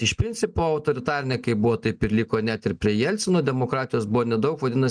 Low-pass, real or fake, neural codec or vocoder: 9.9 kHz; real; none